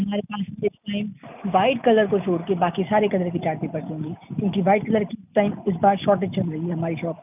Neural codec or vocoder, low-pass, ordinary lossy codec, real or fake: none; 3.6 kHz; none; real